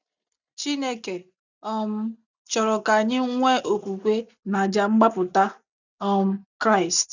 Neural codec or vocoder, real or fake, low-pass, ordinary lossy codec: none; real; 7.2 kHz; none